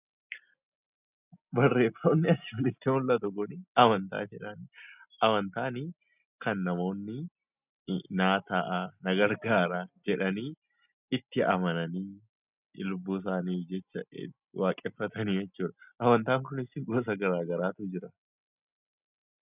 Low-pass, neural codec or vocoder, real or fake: 3.6 kHz; none; real